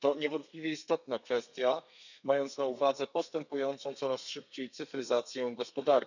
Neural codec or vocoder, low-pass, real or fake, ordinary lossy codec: codec, 32 kHz, 1.9 kbps, SNAC; 7.2 kHz; fake; none